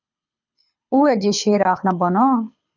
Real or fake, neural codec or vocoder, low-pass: fake; codec, 24 kHz, 6 kbps, HILCodec; 7.2 kHz